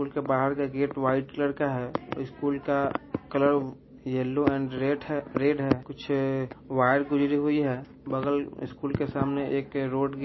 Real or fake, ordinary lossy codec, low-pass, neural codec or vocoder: real; MP3, 24 kbps; 7.2 kHz; none